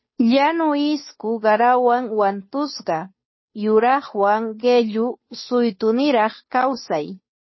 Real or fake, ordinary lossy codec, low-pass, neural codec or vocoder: fake; MP3, 24 kbps; 7.2 kHz; codec, 16 kHz, 2 kbps, FunCodec, trained on Chinese and English, 25 frames a second